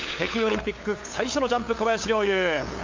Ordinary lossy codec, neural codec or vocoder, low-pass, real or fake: MP3, 48 kbps; codec, 16 kHz, 8 kbps, FunCodec, trained on LibriTTS, 25 frames a second; 7.2 kHz; fake